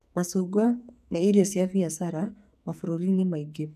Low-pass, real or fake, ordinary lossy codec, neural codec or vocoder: 14.4 kHz; fake; none; codec, 44.1 kHz, 2.6 kbps, SNAC